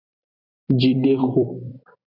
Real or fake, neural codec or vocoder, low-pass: fake; vocoder, 44.1 kHz, 128 mel bands every 256 samples, BigVGAN v2; 5.4 kHz